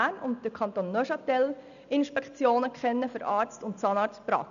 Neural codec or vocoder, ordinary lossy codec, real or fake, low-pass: none; none; real; 7.2 kHz